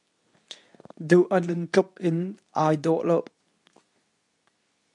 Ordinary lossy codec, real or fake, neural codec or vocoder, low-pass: MP3, 64 kbps; fake; codec, 24 kHz, 0.9 kbps, WavTokenizer, medium speech release version 2; 10.8 kHz